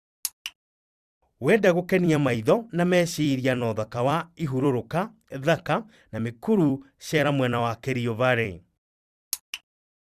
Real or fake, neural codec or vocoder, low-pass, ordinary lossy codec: fake; vocoder, 44.1 kHz, 128 mel bands every 256 samples, BigVGAN v2; 14.4 kHz; Opus, 64 kbps